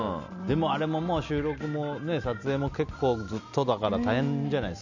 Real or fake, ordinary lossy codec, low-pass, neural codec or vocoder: real; none; 7.2 kHz; none